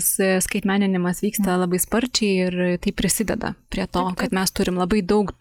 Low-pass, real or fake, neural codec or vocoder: 19.8 kHz; real; none